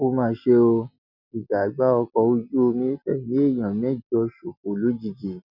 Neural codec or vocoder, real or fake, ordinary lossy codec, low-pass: none; real; none; 5.4 kHz